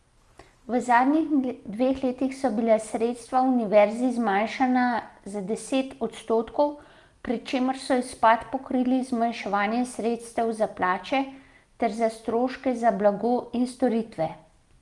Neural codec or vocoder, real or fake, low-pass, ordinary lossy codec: none; real; 10.8 kHz; Opus, 32 kbps